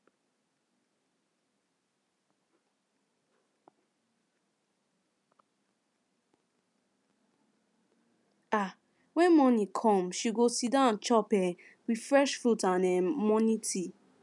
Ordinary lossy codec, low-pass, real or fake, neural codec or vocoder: none; 10.8 kHz; real; none